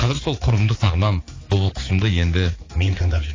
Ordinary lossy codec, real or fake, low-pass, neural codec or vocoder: AAC, 32 kbps; fake; 7.2 kHz; codec, 16 kHz, 4 kbps, X-Codec, HuBERT features, trained on balanced general audio